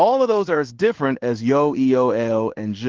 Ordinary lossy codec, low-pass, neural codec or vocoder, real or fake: Opus, 16 kbps; 7.2 kHz; codec, 16 kHz in and 24 kHz out, 1 kbps, XY-Tokenizer; fake